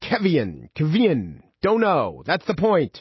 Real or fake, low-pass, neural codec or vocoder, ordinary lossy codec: real; 7.2 kHz; none; MP3, 24 kbps